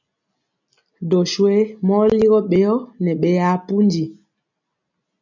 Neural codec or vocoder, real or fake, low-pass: none; real; 7.2 kHz